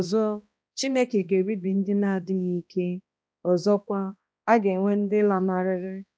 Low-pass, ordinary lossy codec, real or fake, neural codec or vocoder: none; none; fake; codec, 16 kHz, 1 kbps, X-Codec, HuBERT features, trained on balanced general audio